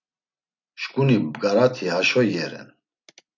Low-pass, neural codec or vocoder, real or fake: 7.2 kHz; none; real